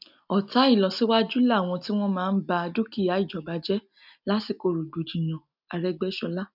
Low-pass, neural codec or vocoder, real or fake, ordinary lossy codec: 5.4 kHz; none; real; none